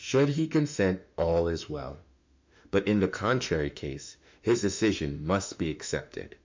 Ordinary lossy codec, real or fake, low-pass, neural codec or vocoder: MP3, 64 kbps; fake; 7.2 kHz; autoencoder, 48 kHz, 32 numbers a frame, DAC-VAE, trained on Japanese speech